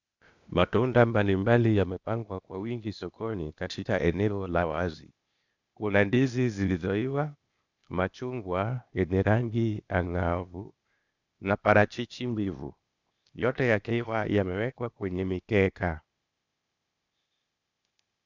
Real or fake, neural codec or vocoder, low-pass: fake; codec, 16 kHz, 0.8 kbps, ZipCodec; 7.2 kHz